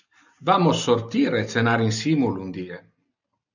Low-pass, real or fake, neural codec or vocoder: 7.2 kHz; real; none